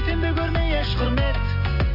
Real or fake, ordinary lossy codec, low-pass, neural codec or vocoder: real; MP3, 32 kbps; 5.4 kHz; none